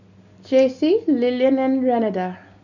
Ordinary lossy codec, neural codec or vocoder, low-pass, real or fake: none; none; 7.2 kHz; real